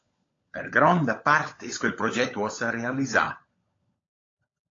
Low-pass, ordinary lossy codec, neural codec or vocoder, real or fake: 7.2 kHz; AAC, 32 kbps; codec, 16 kHz, 16 kbps, FunCodec, trained on LibriTTS, 50 frames a second; fake